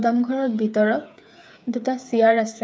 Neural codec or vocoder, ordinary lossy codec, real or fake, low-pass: codec, 16 kHz, 8 kbps, FreqCodec, smaller model; none; fake; none